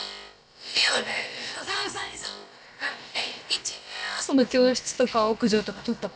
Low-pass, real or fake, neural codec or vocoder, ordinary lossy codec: none; fake; codec, 16 kHz, about 1 kbps, DyCAST, with the encoder's durations; none